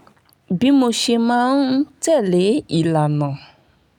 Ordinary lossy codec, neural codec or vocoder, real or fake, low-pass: none; none; real; 19.8 kHz